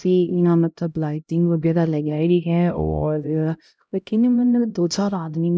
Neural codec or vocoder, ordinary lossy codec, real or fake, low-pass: codec, 16 kHz, 0.5 kbps, X-Codec, HuBERT features, trained on LibriSpeech; none; fake; none